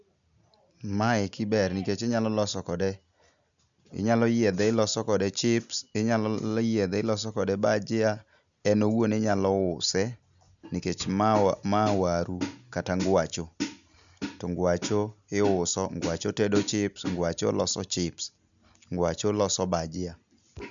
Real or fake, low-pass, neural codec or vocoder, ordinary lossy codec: real; 7.2 kHz; none; none